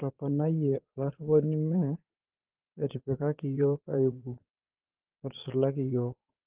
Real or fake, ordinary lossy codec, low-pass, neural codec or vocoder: fake; Opus, 16 kbps; 3.6 kHz; vocoder, 22.05 kHz, 80 mel bands, Vocos